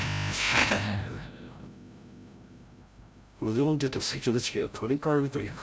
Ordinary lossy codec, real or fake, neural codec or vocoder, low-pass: none; fake; codec, 16 kHz, 0.5 kbps, FreqCodec, larger model; none